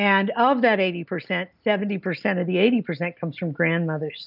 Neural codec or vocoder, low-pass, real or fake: none; 5.4 kHz; real